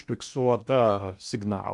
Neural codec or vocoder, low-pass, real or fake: codec, 16 kHz in and 24 kHz out, 0.8 kbps, FocalCodec, streaming, 65536 codes; 10.8 kHz; fake